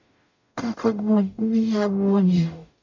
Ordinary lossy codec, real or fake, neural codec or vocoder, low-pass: none; fake; codec, 44.1 kHz, 0.9 kbps, DAC; 7.2 kHz